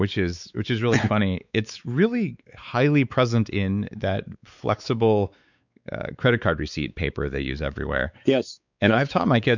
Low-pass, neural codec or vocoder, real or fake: 7.2 kHz; codec, 16 kHz, 4 kbps, X-Codec, WavLM features, trained on Multilingual LibriSpeech; fake